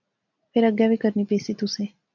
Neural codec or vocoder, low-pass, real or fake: none; 7.2 kHz; real